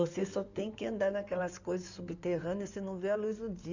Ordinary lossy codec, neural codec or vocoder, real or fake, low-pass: none; codec, 16 kHz in and 24 kHz out, 2.2 kbps, FireRedTTS-2 codec; fake; 7.2 kHz